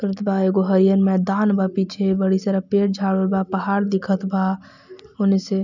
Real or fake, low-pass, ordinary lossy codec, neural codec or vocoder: real; 7.2 kHz; none; none